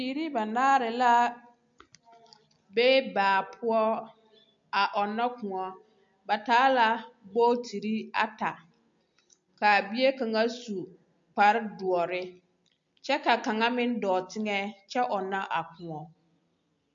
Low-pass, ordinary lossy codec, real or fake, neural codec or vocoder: 7.2 kHz; MP3, 48 kbps; real; none